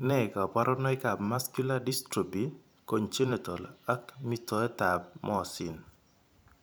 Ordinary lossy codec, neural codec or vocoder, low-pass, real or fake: none; vocoder, 44.1 kHz, 128 mel bands every 512 samples, BigVGAN v2; none; fake